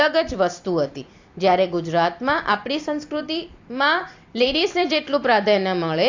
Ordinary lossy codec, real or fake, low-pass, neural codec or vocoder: AAC, 48 kbps; fake; 7.2 kHz; vocoder, 44.1 kHz, 128 mel bands every 256 samples, BigVGAN v2